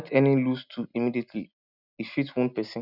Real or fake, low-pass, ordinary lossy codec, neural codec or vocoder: real; 5.4 kHz; none; none